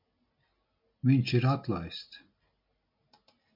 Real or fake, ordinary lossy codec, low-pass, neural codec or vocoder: real; MP3, 48 kbps; 5.4 kHz; none